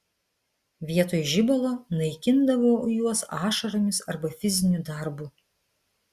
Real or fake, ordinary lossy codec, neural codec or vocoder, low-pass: real; Opus, 64 kbps; none; 14.4 kHz